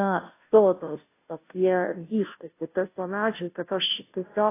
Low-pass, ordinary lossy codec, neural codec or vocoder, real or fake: 3.6 kHz; AAC, 24 kbps; codec, 16 kHz, 0.5 kbps, FunCodec, trained on Chinese and English, 25 frames a second; fake